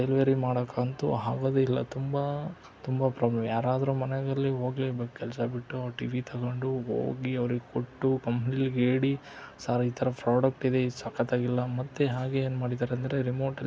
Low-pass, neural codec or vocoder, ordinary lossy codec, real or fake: none; none; none; real